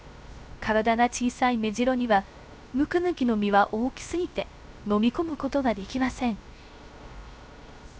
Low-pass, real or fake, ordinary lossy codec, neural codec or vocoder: none; fake; none; codec, 16 kHz, 0.3 kbps, FocalCodec